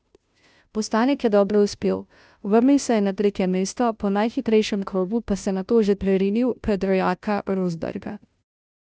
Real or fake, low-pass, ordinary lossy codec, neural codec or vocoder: fake; none; none; codec, 16 kHz, 0.5 kbps, FunCodec, trained on Chinese and English, 25 frames a second